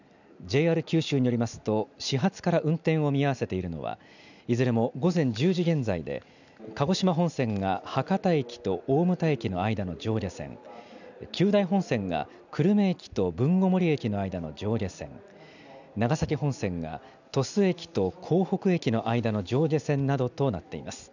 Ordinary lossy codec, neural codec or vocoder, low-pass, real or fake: none; none; 7.2 kHz; real